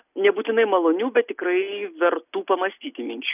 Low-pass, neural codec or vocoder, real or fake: 3.6 kHz; none; real